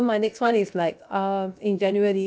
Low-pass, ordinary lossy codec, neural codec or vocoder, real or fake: none; none; codec, 16 kHz, 0.7 kbps, FocalCodec; fake